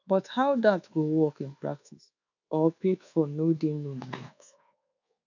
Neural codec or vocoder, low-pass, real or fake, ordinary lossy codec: codec, 24 kHz, 1.2 kbps, DualCodec; 7.2 kHz; fake; none